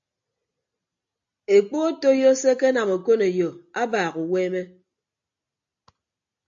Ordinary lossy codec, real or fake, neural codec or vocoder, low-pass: AAC, 64 kbps; real; none; 7.2 kHz